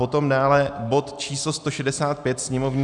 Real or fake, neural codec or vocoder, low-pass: real; none; 10.8 kHz